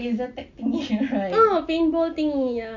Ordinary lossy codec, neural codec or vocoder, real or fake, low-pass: none; none; real; 7.2 kHz